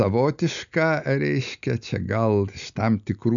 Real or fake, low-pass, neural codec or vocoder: real; 7.2 kHz; none